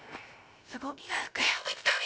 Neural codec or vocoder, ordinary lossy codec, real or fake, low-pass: codec, 16 kHz, 0.3 kbps, FocalCodec; none; fake; none